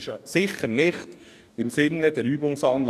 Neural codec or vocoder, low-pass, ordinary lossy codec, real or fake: codec, 44.1 kHz, 2.6 kbps, DAC; 14.4 kHz; none; fake